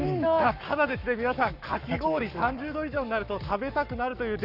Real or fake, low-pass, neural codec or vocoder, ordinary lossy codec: fake; 5.4 kHz; codec, 44.1 kHz, 7.8 kbps, Pupu-Codec; none